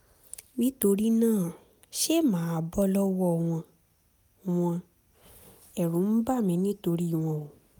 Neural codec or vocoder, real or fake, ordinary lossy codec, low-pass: none; real; none; none